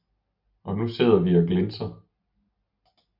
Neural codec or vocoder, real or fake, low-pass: none; real; 5.4 kHz